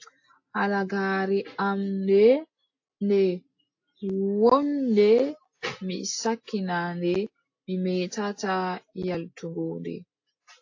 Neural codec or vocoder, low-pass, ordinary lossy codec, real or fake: none; 7.2 kHz; AAC, 48 kbps; real